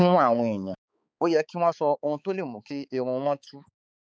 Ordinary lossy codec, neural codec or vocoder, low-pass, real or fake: none; codec, 16 kHz, 4 kbps, X-Codec, HuBERT features, trained on balanced general audio; none; fake